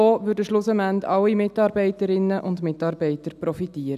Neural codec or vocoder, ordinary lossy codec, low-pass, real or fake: none; none; 14.4 kHz; real